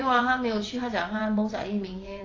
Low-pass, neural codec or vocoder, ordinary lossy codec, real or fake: 7.2 kHz; vocoder, 22.05 kHz, 80 mel bands, WaveNeXt; AAC, 32 kbps; fake